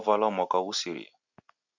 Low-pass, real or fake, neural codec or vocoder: 7.2 kHz; real; none